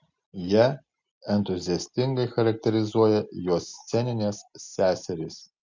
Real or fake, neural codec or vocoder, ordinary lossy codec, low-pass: real; none; MP3, 64 kbps; 7.2 kHz